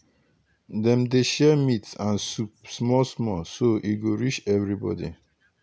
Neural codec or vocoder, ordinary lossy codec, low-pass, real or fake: none; none; none; real